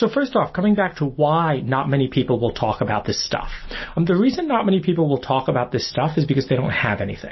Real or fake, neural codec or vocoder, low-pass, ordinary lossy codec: real; none; 7.2 kHz; MP3, 24 kbps